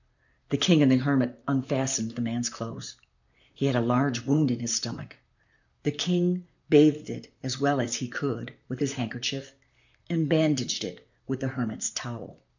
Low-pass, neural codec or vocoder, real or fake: 7.2 kHz; vocoder, 44.1 kHz, 128 mel bands, Pupu-Vocoder; fake